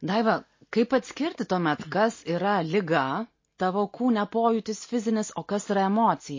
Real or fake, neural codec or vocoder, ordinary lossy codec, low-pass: real; none; MP3, 32 kbps; 7.2 kHz